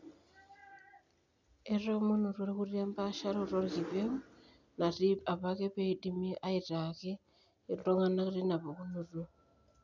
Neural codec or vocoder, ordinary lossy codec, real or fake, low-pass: none; none; real; 7.2 kHz